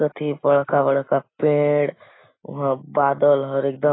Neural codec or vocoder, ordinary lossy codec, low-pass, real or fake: none; AAC, 16 kbps; 7.2 kHz; real